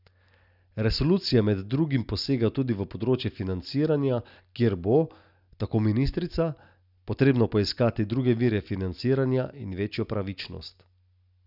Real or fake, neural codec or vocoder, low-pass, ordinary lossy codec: real; none; 5.4 kHz; none